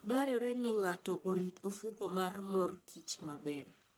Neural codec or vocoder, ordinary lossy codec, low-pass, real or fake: codec, 44.1 kHz, 1.7 kbps, Pupu-Codec; none; none; fake